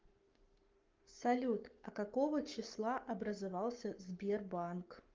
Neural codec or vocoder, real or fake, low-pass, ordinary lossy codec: codec, 44.1 kHz, 7.8 kbps, Pupu-Codec; fake; 7.2 kHz; Opus, 24 kbps